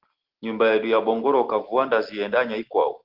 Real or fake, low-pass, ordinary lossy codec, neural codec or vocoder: real; 5.4 kHz; Opus, 16 kbps; none